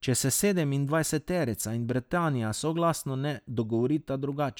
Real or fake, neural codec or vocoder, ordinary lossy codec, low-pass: real; none; none; none